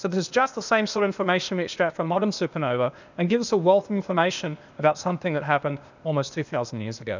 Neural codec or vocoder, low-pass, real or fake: codec, 16 kHz, 0.8 kbps, ZipCodec; 7.2 kHz; fake